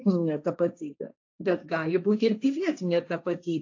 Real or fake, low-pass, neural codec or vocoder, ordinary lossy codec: fake; 7.2 kHz; codec, 16 kHz, 1.1 kbps, Voila-Tokenizer; AAC, 48 kbps